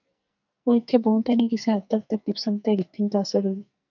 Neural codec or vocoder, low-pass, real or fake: codec, 44.1 kHz, 2.6 kbps, SNAC; 7.2 kHz; fake